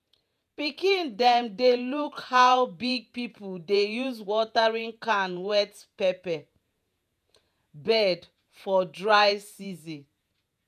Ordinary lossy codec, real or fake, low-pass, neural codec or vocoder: none; fake; 14.4 kHz; vocoder, 48 kHz, 128 mel bands, Vocos